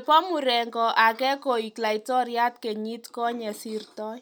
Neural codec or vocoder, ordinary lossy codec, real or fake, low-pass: none; none; real; 19.8 kHz